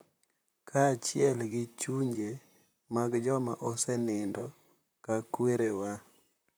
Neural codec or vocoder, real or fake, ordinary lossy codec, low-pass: vocoder, 44.1 kHz, 128 mel bands, Pupu-Vocoder; fake; none; none